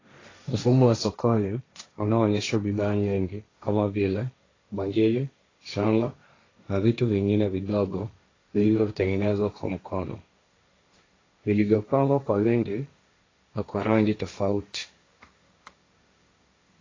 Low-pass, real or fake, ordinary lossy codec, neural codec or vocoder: 7.2 kHz; fake; AAC, 32 kbps; codec, 16 kHz, 1.1 kbps, Voila-Tokenizer